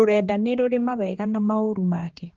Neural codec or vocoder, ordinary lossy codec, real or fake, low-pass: codec, 16 kHz, 2 kbps, X-Codec, HuBERT features, trained on general audio; Opus, 16 kbps; fake; 7.2 kHz